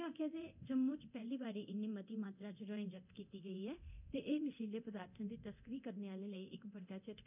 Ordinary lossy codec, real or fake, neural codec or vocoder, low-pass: none; fake; codec, 24 kHz, 0.9 kbps, DualCodec; 3.6 kHz